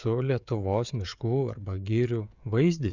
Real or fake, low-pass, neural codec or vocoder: fake; 7.2 kHz; codec, 16 kHz, 16 kbps, FreqCodec, smaller model